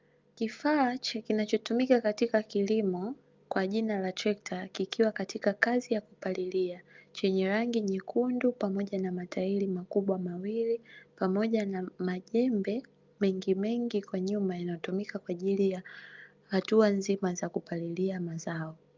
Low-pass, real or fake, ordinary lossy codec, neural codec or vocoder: 7.2 kHz; real; Opus, 24 kbps; none